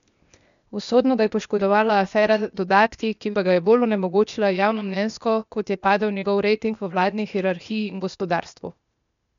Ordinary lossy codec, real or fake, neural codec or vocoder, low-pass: MP3, 96 kbps; fake; codec, 16 kHz, 0.8 kbps, ZipCodec; 7.2 kHz